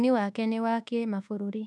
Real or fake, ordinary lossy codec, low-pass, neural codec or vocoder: fake; none; none; codec, 24 kHz, 1.2 kbps, DualCodec